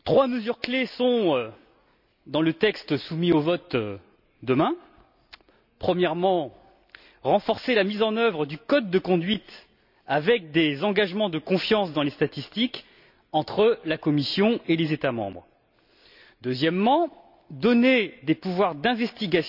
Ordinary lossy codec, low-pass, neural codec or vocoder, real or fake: none; 5.4 kHz; none; real